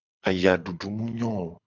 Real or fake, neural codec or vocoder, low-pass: fake; codec, 24 kHz, 6 kbps, HILCodec; 7.2 kHz